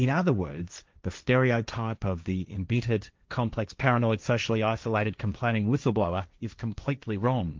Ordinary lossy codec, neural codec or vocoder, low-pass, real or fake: Opus, 24 kbps; codec, 16 kHz, 1.1 kbps, Voila-Tokenizer; 7.2 kHz; fake